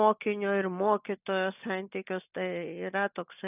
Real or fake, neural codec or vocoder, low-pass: real; none; 3.6 kHz